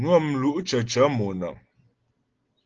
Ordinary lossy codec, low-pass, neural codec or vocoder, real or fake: Opus, 16 kbps; 7.2 kHz; none; real